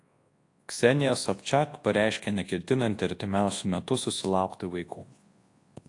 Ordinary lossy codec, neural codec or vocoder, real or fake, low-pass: AAC, 48 kbps; codec, 24 kHz, 0.9 kbps, WavTokenizer, large speech release; fake; 10.8 kHz